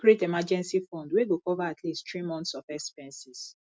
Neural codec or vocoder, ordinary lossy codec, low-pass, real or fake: none; none; none; real